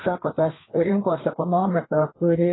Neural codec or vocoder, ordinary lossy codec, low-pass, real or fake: codec, 16 kHz, 2 kbps, FreqCodec, larger model; AAC, 16 kbps; 7.2 kHz; fake